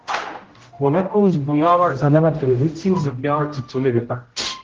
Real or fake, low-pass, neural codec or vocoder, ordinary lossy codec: fake; 7.2 kHz; codec, 16 kHz, 0.5 kbps, X-Codec, HuBERT features, trained on general audio; Opus, 16 kbps